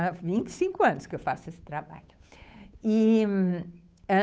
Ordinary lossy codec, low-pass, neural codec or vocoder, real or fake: none; none; codec, 16 kHz, 8 kbps, FunCodec, trained on Chinese and English, 25 frames a second; fake